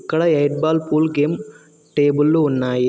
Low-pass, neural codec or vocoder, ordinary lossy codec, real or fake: none; none; none; real